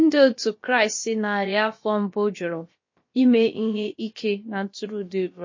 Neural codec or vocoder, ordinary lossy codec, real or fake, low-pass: codec, 16 kHz, about 1 kbps, DyCAST, with the encoder's durations; MP3, 32 kbps; fake; 7.2 kHz